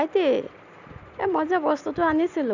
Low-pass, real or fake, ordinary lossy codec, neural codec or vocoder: 7.2 kHz; real; none; none